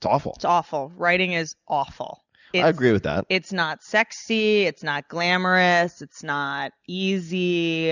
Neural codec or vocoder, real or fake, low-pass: none; real; 7.2 kHz